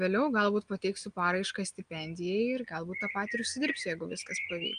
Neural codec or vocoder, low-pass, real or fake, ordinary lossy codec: none; 9.9 kHz; real; Opus, 32 kbps